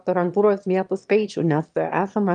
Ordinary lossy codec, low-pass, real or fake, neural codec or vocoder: Opus, 32 kbps; 9.9 kHz; fake; autoencoder, 22.05 kHz, a latent of 192 numbers a frame, VITS, trained on one speaker